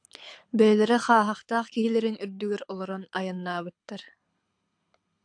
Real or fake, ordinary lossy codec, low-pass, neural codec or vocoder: fake; AAC, 64 kbps; 9.9 kHz; codec, 24 kHz, 6 kbps, HILCodec